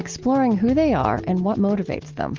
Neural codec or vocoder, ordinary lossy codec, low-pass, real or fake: none; Opus, 16 kbps; 7.2 kHz; real